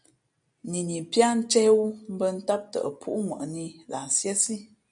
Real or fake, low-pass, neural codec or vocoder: real; 9.9 kHz; none